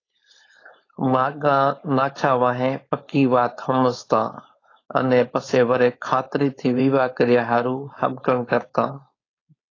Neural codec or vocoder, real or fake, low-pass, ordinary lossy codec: codec, 16 kHz, 4.8 kbps, FACodec; fake; 7.2 kHz; AAC, 32 kbps